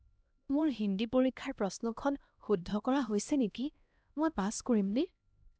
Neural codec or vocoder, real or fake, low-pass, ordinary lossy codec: codec, 16 kHz, 1 kbps, X-Codec, HuBERT features, trained on LibriSpeech; fake; none; none